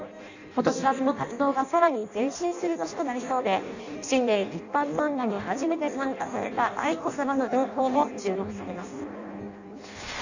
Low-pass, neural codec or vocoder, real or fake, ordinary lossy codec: 7.2 kHz; codec, 16 kHz in and 24 kHz out, 0.6 kbps, FireRedTTS-2 codec; fake; none